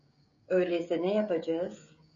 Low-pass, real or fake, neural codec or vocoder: 7.2 kHz; fake; codec, 16 kHz, 16 kbps, FreqCodec, smaller model